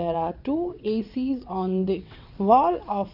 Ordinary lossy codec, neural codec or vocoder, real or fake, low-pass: none; vocoder, 22.05 kHz, 80 mel bands, WaveNeXt; fake; 5.4 kHz